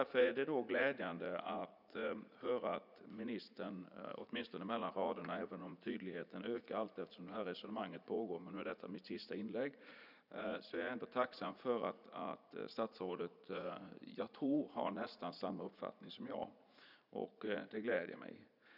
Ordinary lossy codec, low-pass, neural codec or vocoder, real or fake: none; 5.4 kHz; vocoder, 44.1 kHz, 80 mel bands, Vocos; fake